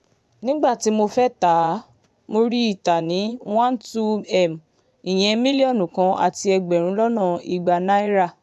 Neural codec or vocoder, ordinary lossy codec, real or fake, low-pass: vocoder, 24 kHz, 100 mel bands, Vocos; none; fake; none